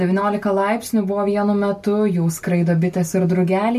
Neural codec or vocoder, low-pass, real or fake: none; 14.4 kHz; real